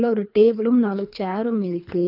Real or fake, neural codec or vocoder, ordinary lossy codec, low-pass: fake; codec, 24 kHz, 3 kbps, HILCodec; none; 5.4 kHz